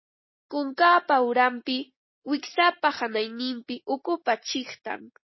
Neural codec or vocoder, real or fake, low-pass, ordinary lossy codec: none; real; 7.2 kHz; MP3, 24 kbps